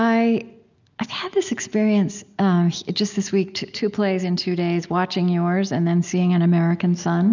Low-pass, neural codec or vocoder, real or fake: 7.2 kHz; none; real